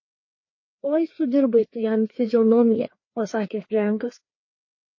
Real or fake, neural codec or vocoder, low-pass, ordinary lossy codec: fake; codec, 16 kHz, 2 kbps, FreqCodec, larger model; 7.2 kHz; MP3, 32 kbps